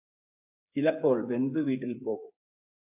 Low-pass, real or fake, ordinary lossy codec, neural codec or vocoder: 3.6 kHz; fake; AAC, 32 kbps; codec, 16 kHz, 8 kbps, FreqCodec, smaller model